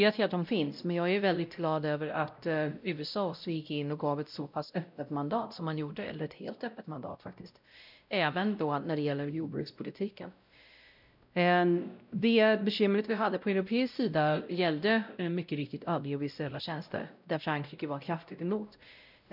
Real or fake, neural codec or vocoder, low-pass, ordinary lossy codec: fake; codec, 16 kHz, 0.5 kbps, X-Codec, WavLM features, trained on Multilingual LibriSpeech; 5.4 kHz; none